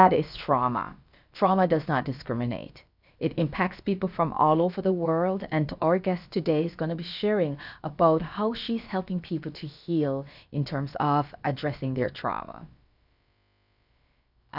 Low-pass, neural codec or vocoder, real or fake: 5.4 kHz; codec, 16 kHz, about 1 kbps, DyCAST, with the encoder's durations; fake